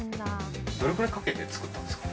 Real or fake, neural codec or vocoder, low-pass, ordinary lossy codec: real; none; none; none